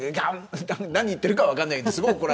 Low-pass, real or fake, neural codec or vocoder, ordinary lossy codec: none; real; none; none